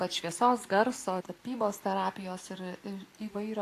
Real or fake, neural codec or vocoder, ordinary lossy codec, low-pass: real; none; AAC, 64 kbps; 14.4 kHz